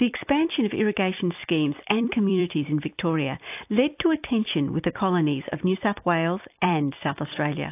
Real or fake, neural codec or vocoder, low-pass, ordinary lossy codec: fake; vocoder, 44.1 kHz, 128 mel bands every 256 samples, BigVGAN v2; 3.6 kHz; AAC, 32 kbps